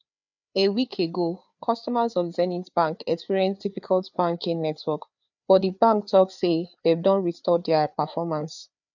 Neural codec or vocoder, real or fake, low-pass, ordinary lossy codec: codec, 16 kHz, 4 kbps, FreqCodec, larger model; fake; 7.2 kHz; none